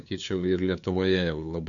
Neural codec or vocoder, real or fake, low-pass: codec, 16 kHz, 2 kbps, FunCodec, trained on LibriTTS, 25 frames a second; fake; 7.2 kHz